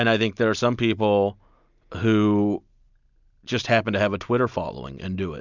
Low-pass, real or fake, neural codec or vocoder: 7.2 kHz; real; none